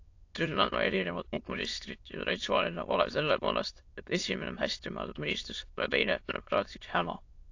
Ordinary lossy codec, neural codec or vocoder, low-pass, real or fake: AAC, 48 kbps; autoencoder, 22.05 kHz, a latent of 192 numbers a frame, VITS, trained on many speakers; 7.2 kHz; fake